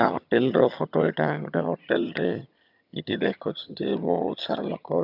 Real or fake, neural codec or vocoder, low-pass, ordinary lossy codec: fake; vocoder, 22.05 kHz, 80 mel bands, HiFi-GAN; 5.4 kHz; none